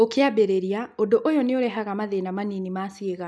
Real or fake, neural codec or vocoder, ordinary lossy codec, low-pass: real; none; none; none